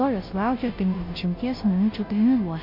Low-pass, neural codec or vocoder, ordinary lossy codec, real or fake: 5.4 kHz; codec, 16 kHz, 0.5 kbps, FunCodec, trained on Chinese and English, 25 frames a second; MP3, 32 kbps; fake